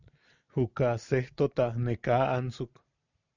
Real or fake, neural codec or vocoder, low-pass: real; none; 7.2 kHz